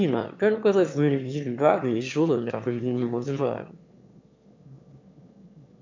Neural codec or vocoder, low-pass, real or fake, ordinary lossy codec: autoencoder, 22.05 kHz, a latent of 192 numbers a frame, VITS, trained on one speaker; 7.2 kHz; fake; MP3, 48 kbps